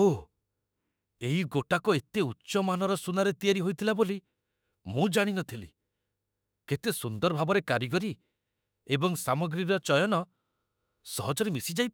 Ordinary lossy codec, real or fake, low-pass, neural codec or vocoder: none; fake; none; autoencoder, 48 kHz, 32 numbers a frame, DAC-VAE, trained on Japanese speech